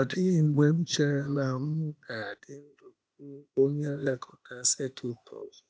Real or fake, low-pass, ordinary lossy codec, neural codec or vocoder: fake; none; none; codec, 16 kHz, 0.8 kbps, ZipCodec